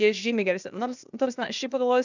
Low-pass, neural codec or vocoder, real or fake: 7.2 kHz; codec, 16 kHz, 0.8 kbps, ZipCodec; fake